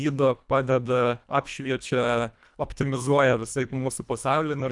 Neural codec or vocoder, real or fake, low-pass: codec, 24 kHz, 1.5 kbps, HILCodec; fake; 10.8 kHz